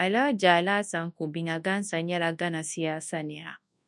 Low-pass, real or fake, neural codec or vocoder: 10.8 kHz; fake; codec, 24 kHz, 0.9 kbps, WavTokenizer, large speech release